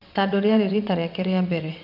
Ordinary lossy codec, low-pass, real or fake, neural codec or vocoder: none; 5.4 kHz; fake; vocoder, 22.05 kHz, 80 mel bands, WaveNeXt